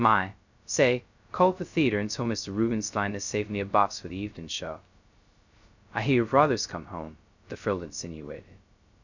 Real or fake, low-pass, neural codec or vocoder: fake; 7.2 kHz; codec, 16 kHz, 0.2 kbps, FocalCodec